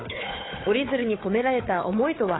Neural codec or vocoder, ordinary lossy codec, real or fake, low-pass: codec, 16 kHz, 4 kbps, FunCodec, trained on Chinese and English, 50 frames a second; AAC, 16 kbps; fake; 7.2 kHz